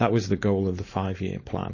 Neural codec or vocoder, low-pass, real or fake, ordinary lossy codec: codec, 16 kHz, 4.8 kbps, FACodec; 7.2 kHz; fake; MP3, 32 kbps